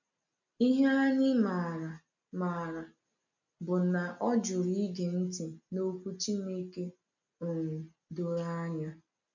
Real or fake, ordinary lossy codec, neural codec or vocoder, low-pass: real; none; none; 7.2 kHz